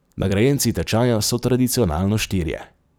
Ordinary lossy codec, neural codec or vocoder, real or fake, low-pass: none; vocoder, 44.1 kHz, 128 mel bands every 256 samples, BigVGAN v2; fake; none